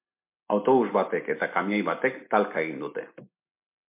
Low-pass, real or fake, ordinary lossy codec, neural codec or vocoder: 3.6 kHz; real; MP3, 32 kbps; none